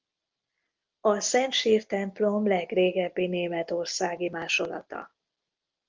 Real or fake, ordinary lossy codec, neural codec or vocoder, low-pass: real; Opus, 32 kbps; none; 7.2 kHz